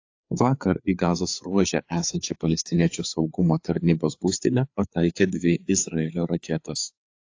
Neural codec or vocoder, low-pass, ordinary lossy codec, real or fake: codec, 16 kHz, 4 kbps, FreqCodec, larger model; 7.2 kHz; AAC, 48 kbps; fake